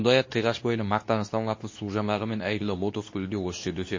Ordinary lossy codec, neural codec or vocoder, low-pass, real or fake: MP3, 32 kbps; codec, 24 kHz, 0.9 kbps, WavTokenizer, medium speech release version 2; 7.2 kHz; fake